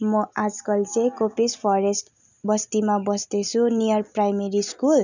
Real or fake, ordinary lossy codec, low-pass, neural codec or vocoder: real; none; 7.2 kHz; none